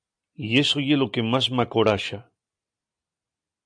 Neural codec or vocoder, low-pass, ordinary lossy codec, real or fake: none; 9.9 kHz; MP3, 96 kbps; real